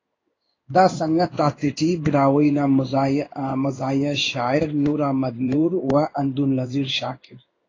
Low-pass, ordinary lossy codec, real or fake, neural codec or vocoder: 7.2 kHz; AAC, 32 kbps; fake; codec, 16 kHz in and 24 kHz out, 1 kbps, XY-Tokenizer